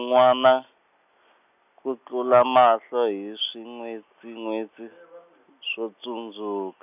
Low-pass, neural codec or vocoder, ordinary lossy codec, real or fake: 3.6 kHz; none; none; real